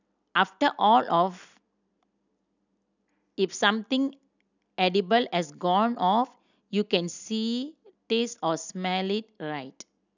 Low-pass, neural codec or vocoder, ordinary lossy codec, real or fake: 7.2 kHz; none; none; real